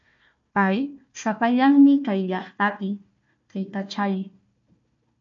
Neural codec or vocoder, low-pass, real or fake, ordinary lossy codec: codec, 16 kHz, 1 kbps, FunCodec, trained on Chinese and English, 50 frames a second; 7.2 kHz; fake; MP3, 48 kbps